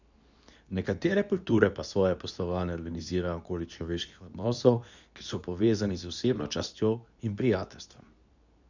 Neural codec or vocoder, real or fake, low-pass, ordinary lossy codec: codec, 24 kHz, 0.9 kbps, WavTokenizer, medium speech release version 2; fake; 7.2 kHz; none